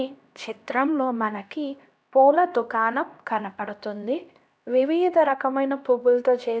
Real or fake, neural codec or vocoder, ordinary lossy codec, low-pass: fake; codec, 16 kHz, 1 kbps, X-Codec, HuBERT features, trained on LibriSpeech; none; none